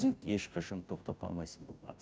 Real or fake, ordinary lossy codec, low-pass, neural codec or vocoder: fake; none; none; codec, 16 kHz, 0.5 kbps, FunCodec, trained on Chinese and English, 25 frames a second